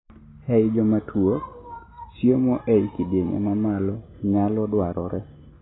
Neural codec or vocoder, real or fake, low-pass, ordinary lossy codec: none; real; 7.2 kHz; AAC, 16 kbps